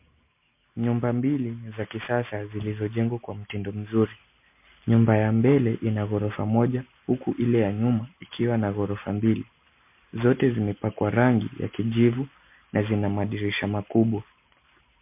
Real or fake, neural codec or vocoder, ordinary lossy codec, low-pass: real; none; MP3, 32 kbps; 3.6 kHz